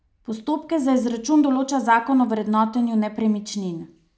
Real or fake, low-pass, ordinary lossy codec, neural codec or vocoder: real; none; none; none